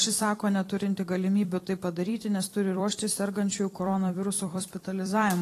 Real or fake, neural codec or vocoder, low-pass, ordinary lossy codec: fake; vocoder, 44.1 kHz, 128 mel bands every 256 samples, BigVGAN v2; 14.4 kHz; AAC, 48 kbps